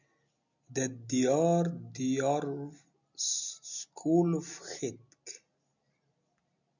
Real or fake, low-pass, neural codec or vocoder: real; 7.2 kHz; none